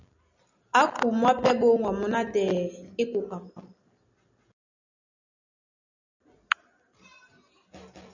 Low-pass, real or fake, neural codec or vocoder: 7.2 kHz; real; none